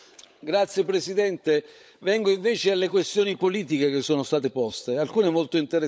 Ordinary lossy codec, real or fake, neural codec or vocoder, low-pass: none; fake; codec, 16 kHz, 16 kbps, FunCodec, trained on LibriTTS, 50 frames a second; none